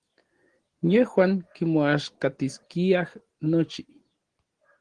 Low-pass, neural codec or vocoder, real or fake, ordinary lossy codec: 9.9 kHz; none; real; Opus, 16 kbps